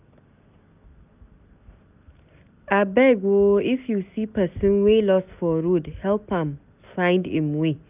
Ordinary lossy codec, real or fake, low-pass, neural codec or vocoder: none; real; 3.6 kHz; none